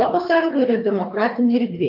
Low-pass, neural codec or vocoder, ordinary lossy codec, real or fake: 5.4 kHz; codec, 24 kHz, 3 kbps, HILCodec; MP3, 32 kbps; fake